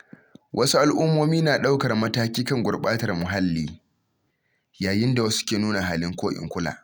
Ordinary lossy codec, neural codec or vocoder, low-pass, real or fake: none; none; none; real